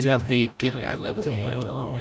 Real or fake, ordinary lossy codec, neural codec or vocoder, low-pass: fake; none; codec, 16 kHz, 0.5 kbps, FreqCodec, larger model; none